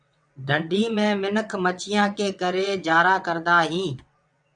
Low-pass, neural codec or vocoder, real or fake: 9.9 kHz; vocoder, 22.05 kHz, 80 mel bands, WaveNeXt; fake